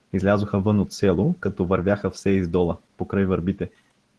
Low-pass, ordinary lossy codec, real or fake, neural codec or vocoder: 10.8 kHz; Opus, 16 kbps; real; none